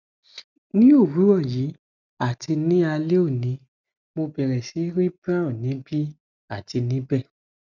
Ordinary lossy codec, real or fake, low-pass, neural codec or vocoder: none; real; 7.2 kHz; none